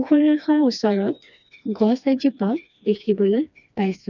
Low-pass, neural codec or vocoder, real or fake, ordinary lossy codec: 7.2 kHz; codec, 16 kHz, 2 kbps, FreqCodec, smaller model; fake; none